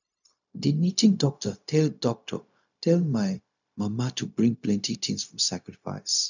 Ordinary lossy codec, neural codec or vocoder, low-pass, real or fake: none; codec, 16 kHz, 0.4 kbps, LongCat-Audio-Codec; 7.2 kHz; fake